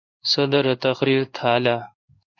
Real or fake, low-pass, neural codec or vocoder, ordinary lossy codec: fake; 7.2 kHz; codec, 16 kHz in and 24 kHz out, 1 kbps, XY-Tokenizer; MP3, 64 kbps